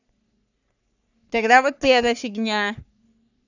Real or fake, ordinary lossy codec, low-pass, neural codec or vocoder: fake; none; 7.2 kHz; codec, 44.1 kHz, 3.4 kbps, Pupu-Codec